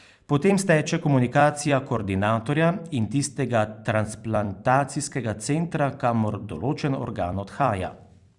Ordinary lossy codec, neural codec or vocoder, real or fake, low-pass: Opus, 64 kbps; vocoder, 44.1 kHz, 128 mel bands every 256 samples, BigVGAN v2; fake; 10.8 kHz